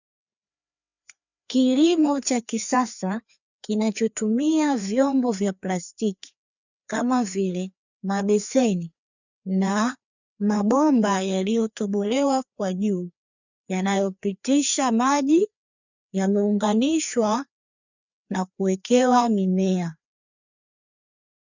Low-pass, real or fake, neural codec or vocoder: 7.2 kHz; fake; codec, 16 kHz, 2 kbps, FreqCodec, larger model